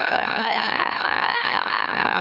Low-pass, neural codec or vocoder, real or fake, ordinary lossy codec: 5.4 kHz; autoencoder, 44.1 kHz, a latent of 192 numbers a frame, MeloTTS; fake; none